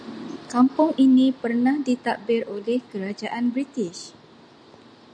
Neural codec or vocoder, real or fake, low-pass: none; real; 9.9 kHz